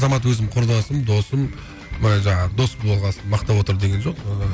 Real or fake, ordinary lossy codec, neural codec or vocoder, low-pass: real; none; none; none